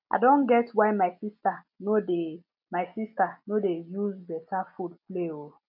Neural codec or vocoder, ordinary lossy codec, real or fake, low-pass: none; none; real; 5.4 kHz